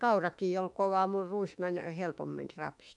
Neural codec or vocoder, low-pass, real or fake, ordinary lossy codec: autoencoder, 48 kHz, 32 numbers a frame, DAC-VAE, trained on Japanese speech; 10.8 kHz; fake; none